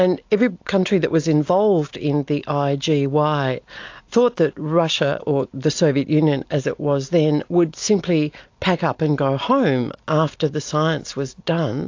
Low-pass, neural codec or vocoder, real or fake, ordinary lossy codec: 7.2 kHz; none; real; AAC, 48 kbps